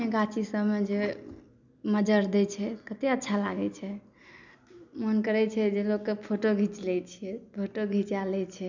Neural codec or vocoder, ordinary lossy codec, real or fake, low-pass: none; none; real; 7.2 kHz